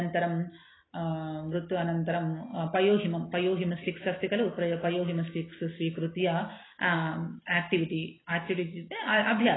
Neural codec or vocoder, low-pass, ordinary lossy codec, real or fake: none; 7.2 kHz; AAC, 16 kbps; real